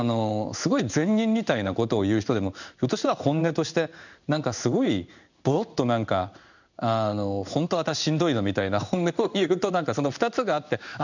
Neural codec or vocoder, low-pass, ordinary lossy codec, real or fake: codec, 16 kHz in and 24 kHz out, 1 kbps, XY-Tokenizer; 7.2 kHz; none; fake